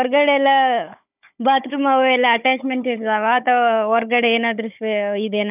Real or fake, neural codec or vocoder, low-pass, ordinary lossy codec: fake; codec, 16 kHz, 16 kbps, FunCodec, trained on Chinese and English, 50 frames a second; 3.6 kHz; none